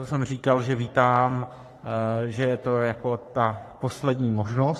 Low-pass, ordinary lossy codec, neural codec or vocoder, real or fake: 14.4 kHz; AAC, 48 kbps; codec, 44.1 kHz, 3.4 kbps, Pupu-Codec; fake